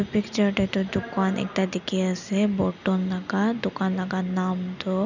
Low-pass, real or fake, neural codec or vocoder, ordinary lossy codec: 7.2 kHz; real; none; none